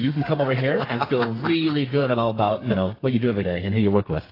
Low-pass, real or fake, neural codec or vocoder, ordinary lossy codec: 5.4 kHz; fake; codec, 32 kHz, 1.9 kbps, SNAC; AAC, 24 kbps